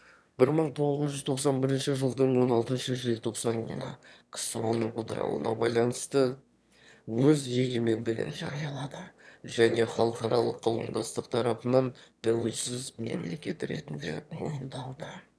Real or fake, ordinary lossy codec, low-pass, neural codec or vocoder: fake; none; none; autoencoder, 22.05 kHz, a latent of 192 numbers a frame, VITS, trained on one speaker